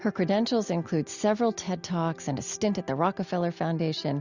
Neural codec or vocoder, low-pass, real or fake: none; 7.2 kHz; real